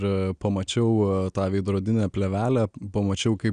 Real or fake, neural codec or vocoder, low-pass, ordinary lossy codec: real; none; 9.9 kHz; Opus, 64 kbps